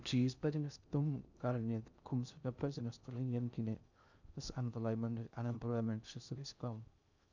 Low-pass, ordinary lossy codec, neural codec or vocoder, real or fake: 7.2 kHz; none; codec, 16 kHz in and 24 kHz out, 0.6 kbps, FocalCodec, streaming, 2048 codes; fake